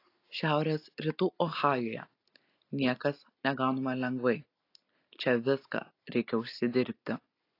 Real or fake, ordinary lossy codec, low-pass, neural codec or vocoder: real; AAC, 32 kbps; 5.4 kHz; none